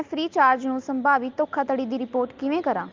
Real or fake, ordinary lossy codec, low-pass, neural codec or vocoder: real; Opus, 32 kbps; 7.2 kHz; none